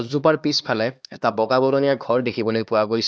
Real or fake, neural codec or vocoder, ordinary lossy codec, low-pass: fake; codec, 16 kHz, 2 kbps, X-Codec, HuBERT features, trained on LibriSpeech; none; none